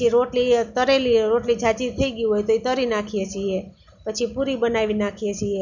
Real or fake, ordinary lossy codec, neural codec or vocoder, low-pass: real; none; none; 7.2 kHz